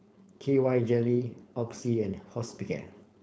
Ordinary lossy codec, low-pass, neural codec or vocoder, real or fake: none; none; codec, 16 kHz, 4.8 kbps, FACodec; fake